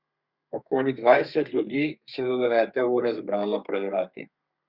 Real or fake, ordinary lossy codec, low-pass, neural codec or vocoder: fake; Opus, 64 kbps; 5.4 kHz; codec, 32 kHz, 1.9 kbps, SNAC